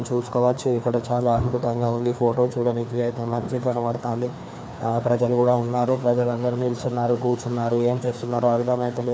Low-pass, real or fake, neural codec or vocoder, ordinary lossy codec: none; fake; codec, 16 kHz, 2 kbps, FreqCodec, larger model; none